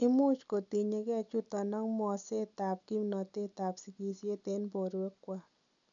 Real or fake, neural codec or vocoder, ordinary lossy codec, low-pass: real; none; none; 7.2 kHz